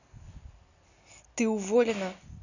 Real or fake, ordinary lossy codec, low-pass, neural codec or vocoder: real; none; 7.2 kHz; none